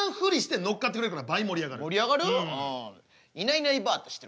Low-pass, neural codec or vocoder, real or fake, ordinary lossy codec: none; none; real; none